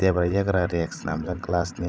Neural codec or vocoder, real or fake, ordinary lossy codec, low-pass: codec, 16 kHz, 16 kbps, FreqCodec, larger model; fake; none; none